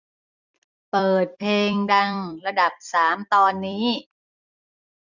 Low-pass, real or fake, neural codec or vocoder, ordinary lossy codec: 7.2 kHz; fake; vocoder, 44.1 kHz, 128 mel bands every 256 samples, BigVGAN v2; none